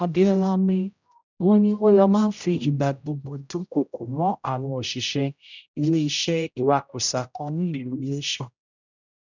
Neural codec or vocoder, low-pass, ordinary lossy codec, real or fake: codec, 16 kHz, 0.5 kbps, X-Codec, HuBERT features, trained on general audio; 7.2 kHz; none; fake